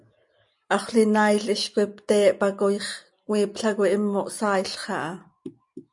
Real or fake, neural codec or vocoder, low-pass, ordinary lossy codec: real; none; 10.8 kHz; MP3, 64 kbps